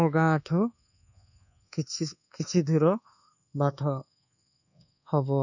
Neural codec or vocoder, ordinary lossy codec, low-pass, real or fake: codec, 24 kHz, 1.2 kbps, DualCodec; none; 7.2 kHz; fake